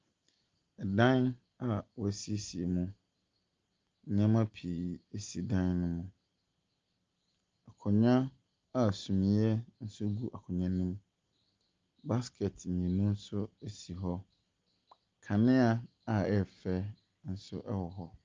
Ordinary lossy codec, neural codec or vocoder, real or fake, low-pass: Opus, 24 kbps; none; real; 7.2 kHz